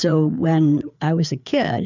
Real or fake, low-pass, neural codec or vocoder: fake; 7.2 kHz; codec, 16 kHz, 4 kbps, FreqCodec, larger model